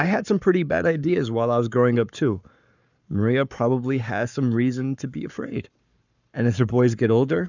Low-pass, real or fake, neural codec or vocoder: 7.2 kHz; fake; codec, 44.1 kHz, 7.8 kbps, Pupu-Codec